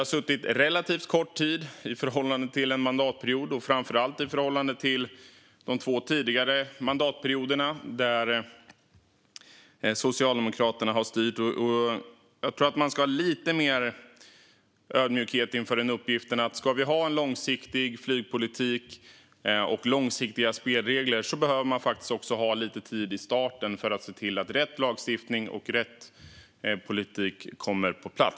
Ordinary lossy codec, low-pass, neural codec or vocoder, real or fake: none; none; none; real